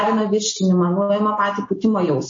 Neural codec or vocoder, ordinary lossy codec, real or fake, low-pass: none; MP3, 32 kbps; real; 7.2 kHz